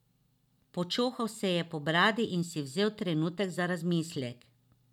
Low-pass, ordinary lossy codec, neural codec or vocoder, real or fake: 19.8 kHz; none; none; real